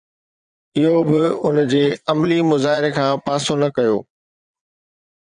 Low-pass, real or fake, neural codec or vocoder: 9.9 kHz; fake; vocoder, 22.05 kHz, 80 mel bands, Vocos